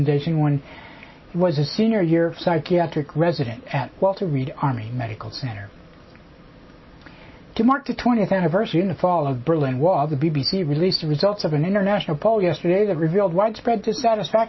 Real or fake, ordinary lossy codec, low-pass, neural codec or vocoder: real; MP3, 24 kbps; 7.2 kHz; none